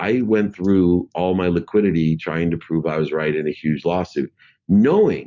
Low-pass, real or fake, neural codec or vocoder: 7.2 kHz; real; none